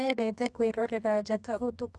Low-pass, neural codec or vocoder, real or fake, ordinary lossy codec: none; codec, 24 kHz, 0.9 kbps, WavTokenizer, medium music audio release; fake; none